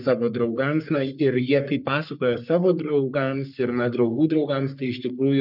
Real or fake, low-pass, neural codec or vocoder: fake; 5.4 kHz; codec, 44.1 kHz, 3.4 kbps, Pupu-Codec